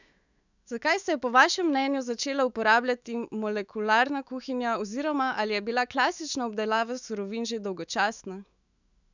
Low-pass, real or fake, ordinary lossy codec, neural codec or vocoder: 7.2 kHz; fake; none; autoencoder, 48 kHz, 128 numbers a frame, DAC-VAE, trained on Japanese speech